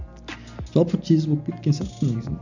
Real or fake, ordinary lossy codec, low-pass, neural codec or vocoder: real; none; 7.2 kHz; none